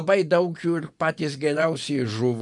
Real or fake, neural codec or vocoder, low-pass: fake; vocoder, 24 kHz, 100 mel bands, Vocos; 10.8 kHz